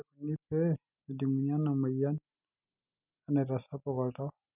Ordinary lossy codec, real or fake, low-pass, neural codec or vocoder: none; real; 3.6 kHz; none